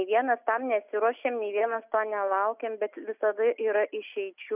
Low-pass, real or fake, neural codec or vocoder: 3.6 kHz; real; none